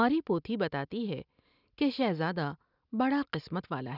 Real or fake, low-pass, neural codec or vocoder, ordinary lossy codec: real; 5.4 kHz; none; none